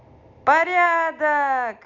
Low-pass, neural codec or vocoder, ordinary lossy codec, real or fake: 7.2 kHz; none; none; real